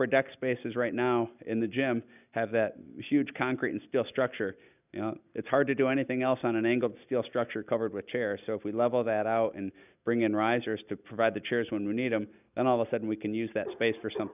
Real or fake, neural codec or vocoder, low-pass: real; none; 3.6 kHz